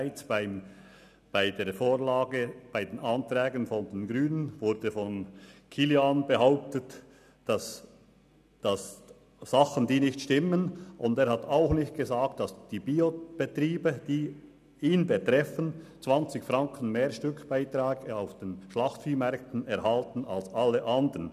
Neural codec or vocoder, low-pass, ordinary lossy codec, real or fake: none; 14.4 kHz; none; real